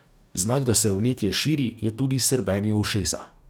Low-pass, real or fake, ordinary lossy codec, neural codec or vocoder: none; fake; none; codec, 44.1 kHz, 2.6 kbps, DAC